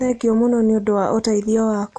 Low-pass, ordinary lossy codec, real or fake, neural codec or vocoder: 9.9 kHz; none; real; none